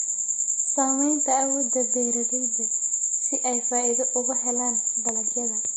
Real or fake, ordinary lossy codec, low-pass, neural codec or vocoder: real; MP3, 32 kbps; 9.9 kHz; none